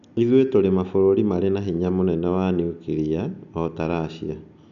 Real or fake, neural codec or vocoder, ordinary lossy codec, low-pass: real; none; none; 7.2 kHz